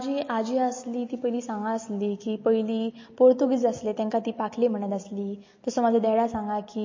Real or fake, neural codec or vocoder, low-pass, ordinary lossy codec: real; none; 7.2 kHz; MP3, 32 kbps